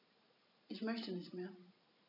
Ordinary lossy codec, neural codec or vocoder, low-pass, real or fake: none; none; 5.4 kHz; real